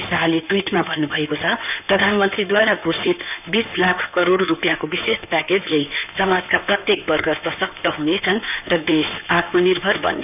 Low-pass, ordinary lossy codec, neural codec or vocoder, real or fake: 3.6 kHz; none; codec, 16 kHz in and 24 kHz out, 2.2 kbps, FireRedTTS-2 codec; fake